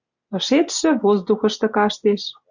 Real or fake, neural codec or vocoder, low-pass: real; none; 7.2 kHz